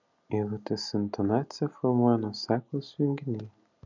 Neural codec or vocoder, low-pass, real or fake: none; 7.2 kHz; real